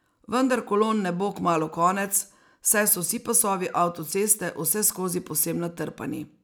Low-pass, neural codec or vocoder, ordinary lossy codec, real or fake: none; none; none; real